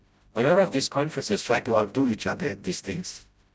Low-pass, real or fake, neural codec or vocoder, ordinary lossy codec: none; fake; codec, 16 kHz, 0.5 kbps, FreqCodec, smaller model; none